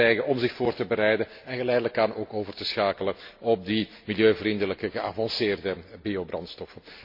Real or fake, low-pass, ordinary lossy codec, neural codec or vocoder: real; 5.4 kHz; MP3, 32 kbps; none